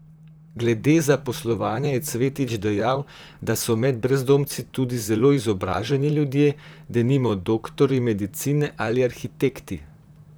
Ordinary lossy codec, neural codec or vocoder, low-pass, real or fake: none; vocoder, 44.1 kHz, 128 mel bands, Pupu-Vocoder; none; fake